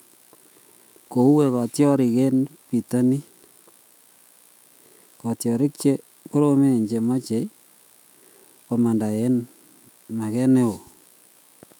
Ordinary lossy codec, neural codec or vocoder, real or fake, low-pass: none; autoencoder, 48 kHz, 128 numbers a frame, DAC-VAE, trained on Japanese speech; fake; 19.8 kHz